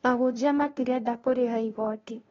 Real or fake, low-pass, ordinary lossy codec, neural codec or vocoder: fake; 7.2 kHz; AAC, 24 kbps; codec, 16 kHz, 0.5 kbps, FunCodec, trained on LibriTTS, 25 frames a second